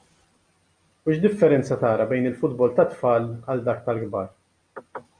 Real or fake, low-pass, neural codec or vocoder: real; 9.9 kHz; none